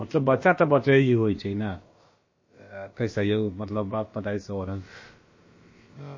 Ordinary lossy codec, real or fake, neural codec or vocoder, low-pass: MP3, 32 kbps; fake; codec, 16 kHz, about 1 kbps, DyCAST, with the encoder's durations; 7.2 kHz